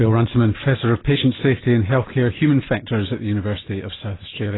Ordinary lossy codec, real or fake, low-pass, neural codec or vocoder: AAC, 16 kbps; real; 7.2 kHz; none